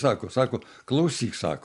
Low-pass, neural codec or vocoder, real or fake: 10.8 kHz; none; real